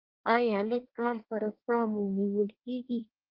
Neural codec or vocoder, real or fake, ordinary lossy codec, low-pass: codec, 24 kHz, 1 kbps, SNAC; fake; Opus, 32 kbps; 5.4 kHz